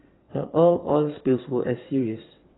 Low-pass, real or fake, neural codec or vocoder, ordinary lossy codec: 7.2 kHz; fake; vocoder, 22.05 kHz, 80 mel bands, WaveNeXt; AAC, 16 kbps